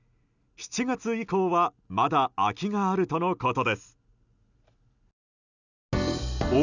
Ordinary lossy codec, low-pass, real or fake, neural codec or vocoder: none; 7.2 kHz; real; none